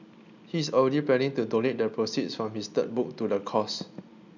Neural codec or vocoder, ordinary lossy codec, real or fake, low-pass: none; none; real; 7.2 kHz